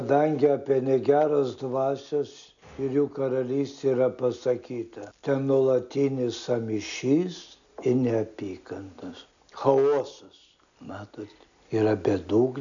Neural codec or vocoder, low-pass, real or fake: none; 7.2 kHz; real